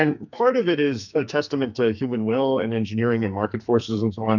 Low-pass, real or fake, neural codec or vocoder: 7.2 kHz; fake; codec, 44.1 kHz, 2.6 kbps, SNAC